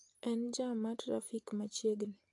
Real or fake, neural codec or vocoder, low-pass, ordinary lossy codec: real; none; 10.8 kHz; AAC, 48 kbps